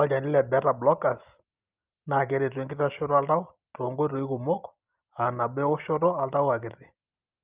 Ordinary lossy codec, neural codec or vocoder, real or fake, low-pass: Opus, 24 kbps; none; real; 3.6 kHz